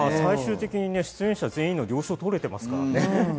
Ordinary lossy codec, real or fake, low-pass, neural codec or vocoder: none; real; none; none